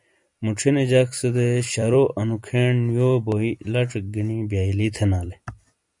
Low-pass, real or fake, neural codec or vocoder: 10.8 kHz; fake; vocoder, 44.1 kHz, 128 mel bands every 256 samples, BigVGAN v2